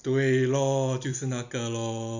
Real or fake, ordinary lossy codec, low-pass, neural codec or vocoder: fake; none; 7.2 kHz; vocoder, 44.1 kHz, 128 mel bands every 256 samples, BigVGAN v2